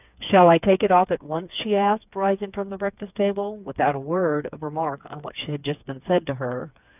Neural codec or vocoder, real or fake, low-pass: codec, 16 kHz, 4 kbps, FreqCodec, smaller model; fake; 3.6 kHz